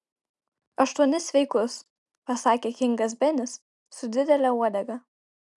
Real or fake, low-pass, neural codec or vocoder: real; 10.8 kHz; none